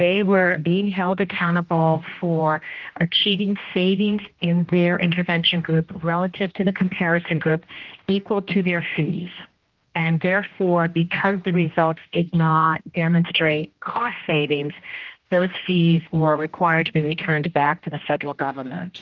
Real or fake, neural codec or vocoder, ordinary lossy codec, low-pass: fake; codec, 16 kHz, 1 kbps, X-Codec, HuBERT features, trained on general audio; Opus, 32 kbps; 7.2 kHz